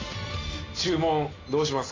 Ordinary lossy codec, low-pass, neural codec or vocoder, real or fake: AAC, 32 kbps; 7.2 kHz; none; real